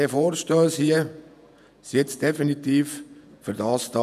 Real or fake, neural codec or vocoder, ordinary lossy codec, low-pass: fake; vocoder, 48 kHz, 128 mel bands, Vocos; none; 14.4 kHz